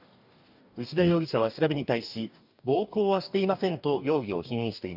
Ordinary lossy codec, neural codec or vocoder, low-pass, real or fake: none; codec, 44.1 kHz, 2.6 kbps, DAC; 5.4 kHz; fake